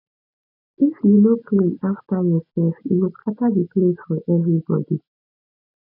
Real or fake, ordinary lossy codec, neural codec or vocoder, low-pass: real; none; none; 5.4 kHz